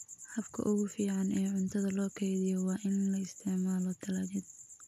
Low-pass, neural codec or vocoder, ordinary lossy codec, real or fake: 14.4 kHz; none; none; real